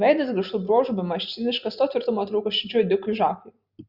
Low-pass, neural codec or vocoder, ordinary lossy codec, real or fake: 5.4 kHz; none; Opus, 64 kbps; real